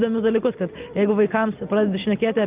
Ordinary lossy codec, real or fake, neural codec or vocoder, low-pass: Opus, 24 kbps; real; none; 3.6 kHz